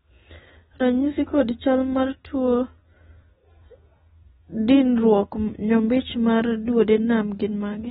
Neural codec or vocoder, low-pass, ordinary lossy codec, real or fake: none; 19.8 kHz; AAC, 16 kbps; real